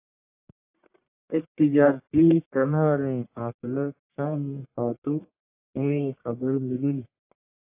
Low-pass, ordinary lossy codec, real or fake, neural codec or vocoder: 3.6 kHz; AAC, 24 kbps; fake; codec, 44.1 kHz, 1.7 kbps, Pupu-Codec